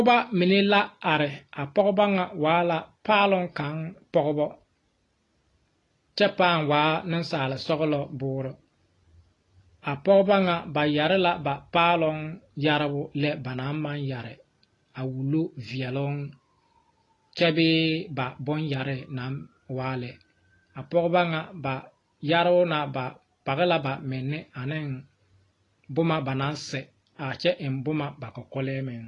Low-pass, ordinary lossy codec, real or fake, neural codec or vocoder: 10.8 kHz; AAC, 32 kbps; real; none